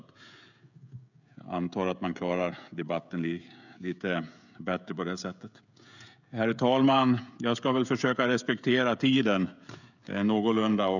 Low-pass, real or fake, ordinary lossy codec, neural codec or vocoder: 7.2 kHz; fake; none; codec, 16 kHz, 16 kbps, FreqCodec, smaller model